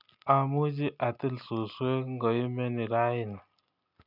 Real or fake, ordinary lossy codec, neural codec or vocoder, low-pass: real; none; none; 5.4 kHz